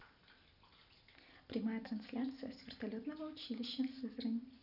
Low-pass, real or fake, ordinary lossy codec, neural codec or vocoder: 5.4 kHz; real; none; none